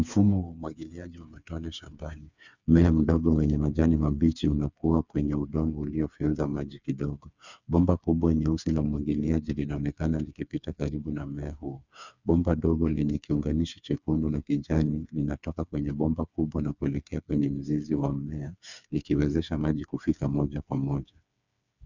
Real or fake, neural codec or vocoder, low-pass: fake; codec, 16 kHz, 4 kbps, FreqCodec, smaller model; 7.2 kHz